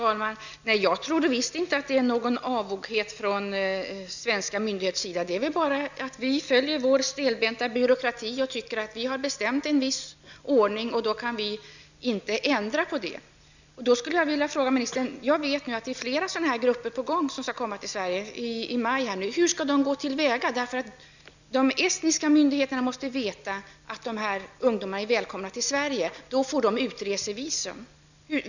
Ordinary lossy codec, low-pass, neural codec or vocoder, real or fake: none; 7.2 kHz; none; real